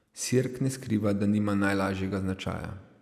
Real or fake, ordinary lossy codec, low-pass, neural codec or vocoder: real; none; 14.4 kHz; none